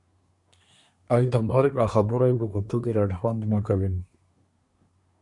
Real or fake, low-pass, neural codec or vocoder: fake; 10.8 kHz; codec, 24 kHz, 1 kbps, SNAC